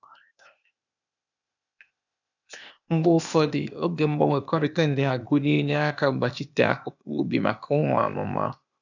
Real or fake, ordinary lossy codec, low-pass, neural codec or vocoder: fake; none; 7.2 kHz; codec, 16 kHz, 0.8 kbps, ZipCodec